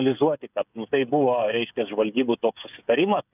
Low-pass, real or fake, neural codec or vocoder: 3.6 kHz; fake; codec, 16 kHz, 8 kbps, FreqCodec, smaller model